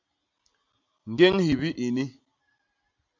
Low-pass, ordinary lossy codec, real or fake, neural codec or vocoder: 7.2 kHz; MP3, 64 kbps; real; none